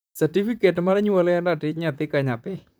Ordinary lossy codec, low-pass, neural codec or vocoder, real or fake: none; none; vocoder, 44.1 kHz, 128 mel bands, Pupu-Vocoder; fake